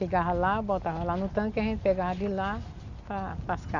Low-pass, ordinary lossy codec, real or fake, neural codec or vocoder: 7.2 kHz; none; real; none